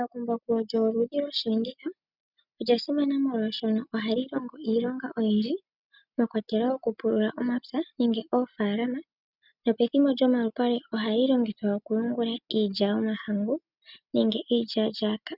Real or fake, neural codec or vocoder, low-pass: real; none; 5.4 kHz